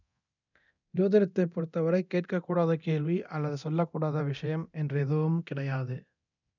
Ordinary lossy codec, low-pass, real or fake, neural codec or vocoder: none; 7.2 kHz; fake; codec, 24 kHz, 0.9 kbps, DualCodec